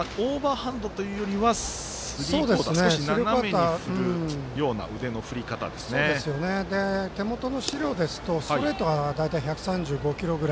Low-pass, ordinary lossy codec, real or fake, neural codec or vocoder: none; none; real; none